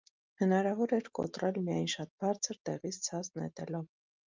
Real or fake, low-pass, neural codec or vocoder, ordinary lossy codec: real; 7.2 kHz; none; Opus, 24 kbps